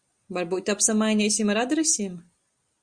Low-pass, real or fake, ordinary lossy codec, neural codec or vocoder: 9.9 kHz; real; Opus, 64 kbps; none